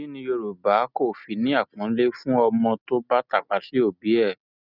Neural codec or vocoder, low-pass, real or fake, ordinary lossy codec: none; 5.4 kHz; real; none